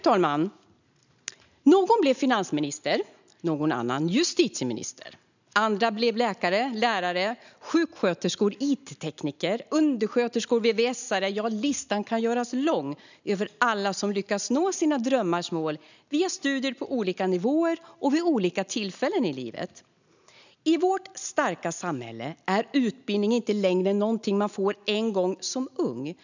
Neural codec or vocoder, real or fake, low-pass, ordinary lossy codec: none; real; 7.2 kHz; none